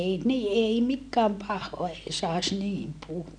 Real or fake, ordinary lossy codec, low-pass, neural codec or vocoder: real; none; 9.9 kHz; none